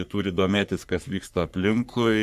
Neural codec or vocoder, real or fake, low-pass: codec, 44.1 kHz, 3.4 kbps, Pupu-Codec; fake; 14.4 kHz